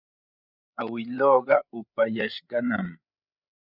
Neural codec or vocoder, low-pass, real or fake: codec, 16 kHz, 8 kbps, FreqCodec, larger model; 5.4 kHz; fake